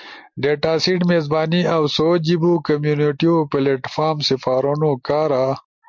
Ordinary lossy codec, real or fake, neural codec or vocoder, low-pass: MP3, 48 kbps; real; none; 7.2 kHz